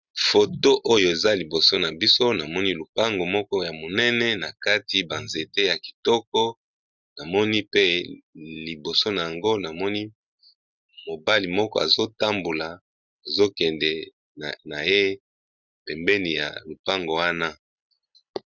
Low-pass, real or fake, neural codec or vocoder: 7.2 kHz; real; none